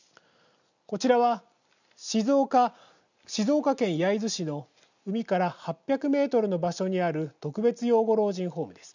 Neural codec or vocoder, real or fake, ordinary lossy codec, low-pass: none; real; none; 7.2 kHz